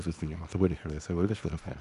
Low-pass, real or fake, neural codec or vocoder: 10.8 kHz; fake; codec, 24 kHz, 0.9 kbps, WavTokenizer, small release